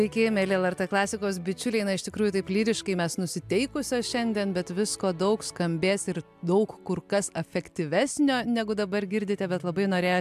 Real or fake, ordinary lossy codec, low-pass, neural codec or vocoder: real; AAC, 96 kbps; 14.4 kHz; none